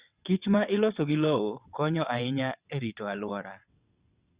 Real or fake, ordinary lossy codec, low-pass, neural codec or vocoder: fake; Opus, 64 kbps; 3.6 kHz; vocoder, 22.05 kHz, 80 mel bands, WaveNeXt